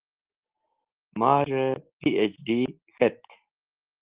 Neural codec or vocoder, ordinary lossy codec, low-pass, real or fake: none; Opus, 16 kbps; 3.6 kHz; real